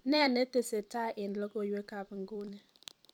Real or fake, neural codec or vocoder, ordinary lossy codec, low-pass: fake; vocoder, 44.1 kHz, 128 mel bands every 256 samples, BigVGAN v2; none; 19.8 kHz